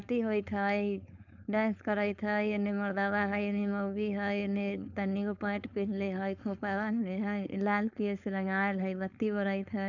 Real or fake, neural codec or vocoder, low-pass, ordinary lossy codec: fake; codec, 16 kHz, 4.8 kbps, FACodec; 7.2 kHz; none